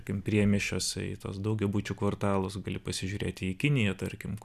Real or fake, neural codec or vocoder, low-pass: real; none; 14.4 kHz